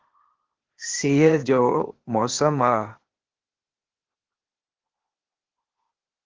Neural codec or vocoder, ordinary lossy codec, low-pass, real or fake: codec, 16 kHz, 0.8 kbps, ZipCodec; Opus, 16 kbps; 7.2 kHz; fake